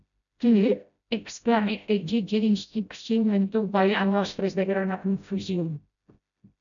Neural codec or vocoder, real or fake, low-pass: codec, 16 kHz, 0.5 kbps, FreqCodec, smaller model; fake; 7.2 kHz